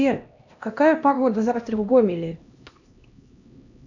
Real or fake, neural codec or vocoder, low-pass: fake; codec, 16 kHz, 1 kbps, X-Codec, HuBERT features, trained on LibriSpeech; 7.2 kHz